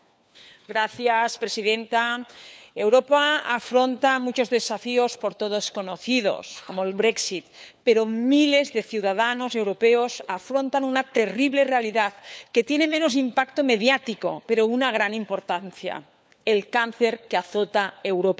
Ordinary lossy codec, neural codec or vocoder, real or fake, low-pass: none; codec, 16 kHz, 4 kbps, FunCodec, trained on LibriTTS, 50 frames a second; fake; none